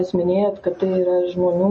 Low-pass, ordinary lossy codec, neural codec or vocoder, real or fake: 10.8 kHz; MP3, 32 kbps; none; real